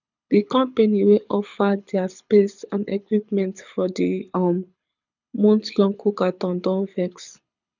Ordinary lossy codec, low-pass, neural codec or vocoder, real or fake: none; 7.2 kHz; codec, 24 kHz, 6 kbps, HILCodec; fake